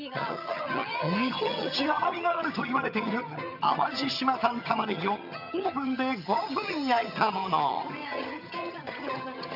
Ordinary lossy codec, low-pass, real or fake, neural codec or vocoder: none; 5.4 kHz; fake; vocoder, 22.05 kHz, 80 mel bands, HiFi-GAN